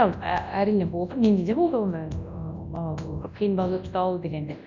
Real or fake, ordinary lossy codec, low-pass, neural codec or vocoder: fake; none; 7.2 kHz; codec, 24 kHz, 0.9 kbps, WavTokenizer, large speech release